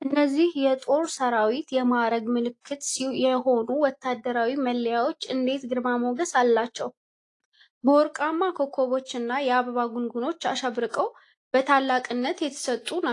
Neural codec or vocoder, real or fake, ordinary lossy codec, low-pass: none; real; AAC, 48 kbps; 10.8 kHz